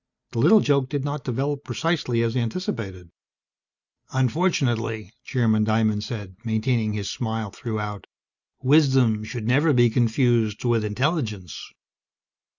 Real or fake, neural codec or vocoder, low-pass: real; none; 7.2 kHz